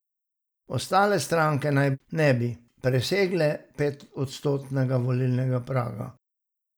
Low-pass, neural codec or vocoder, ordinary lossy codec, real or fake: none; none; none; real